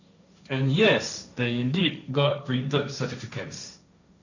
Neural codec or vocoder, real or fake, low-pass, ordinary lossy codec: codec, 16 kHz, 1.1 kbps, Voila-Tokenizer; fake; 7.2 kHz; none